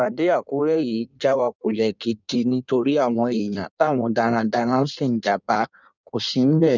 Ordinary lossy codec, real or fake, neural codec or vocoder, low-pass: none; fake; codec, 16 kHz in and 24 kHz out, 1.1 kbps, FireRedTTS-2 codec; 7.2 kHz